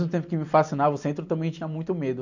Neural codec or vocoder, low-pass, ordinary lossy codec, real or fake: none; 7.2 kHz; none; real